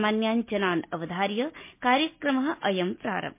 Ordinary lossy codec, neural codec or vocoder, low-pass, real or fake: MP3, 24 kbps; none; 3.6 kHz; real